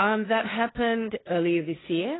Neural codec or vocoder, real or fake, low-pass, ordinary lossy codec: codec, 16 kHz, 1.1 kbps, Voila-Tokenizer; fake; 7.2 kHz; AAC, 16 kbps